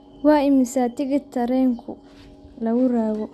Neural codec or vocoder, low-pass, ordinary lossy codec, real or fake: none; none; none; real